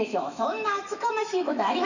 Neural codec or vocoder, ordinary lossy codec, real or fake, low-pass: autoencoder, 48 kHz, 128 numbers a frame, DAC-VAE, trained on Japanese speech; none; fake; 7.2 kHz